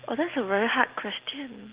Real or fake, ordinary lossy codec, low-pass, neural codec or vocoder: real; Opus, 16 kbps; 3.6 kHz; none